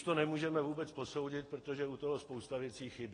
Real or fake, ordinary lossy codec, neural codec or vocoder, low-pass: real; AAC, 32 kbps; none; 9.9 kHz